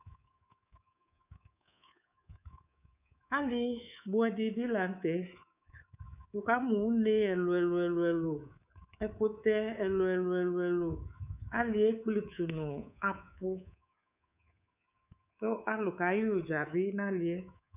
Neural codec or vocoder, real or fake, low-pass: codec, 24 kHz, 3.1 kbps, DualCodec; fake; 3.6 kHz